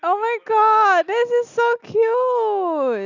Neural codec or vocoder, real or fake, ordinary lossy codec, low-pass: none; real; Opus, 64 kbps; 7.2 kHz